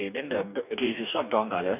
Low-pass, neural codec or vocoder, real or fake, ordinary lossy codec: 3.6 kHz; codec, 44.1 kHz, 2.6 kbps, DAC; fake; none